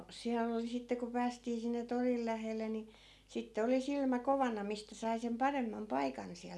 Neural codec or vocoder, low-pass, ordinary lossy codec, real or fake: none; none; none; real